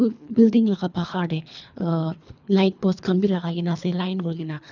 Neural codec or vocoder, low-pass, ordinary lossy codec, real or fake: codec, 24 kHz, 3 kbps, HILCodec; 7.2 kHz; none; fake